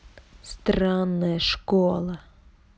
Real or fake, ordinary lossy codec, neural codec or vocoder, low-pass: real; none; none; none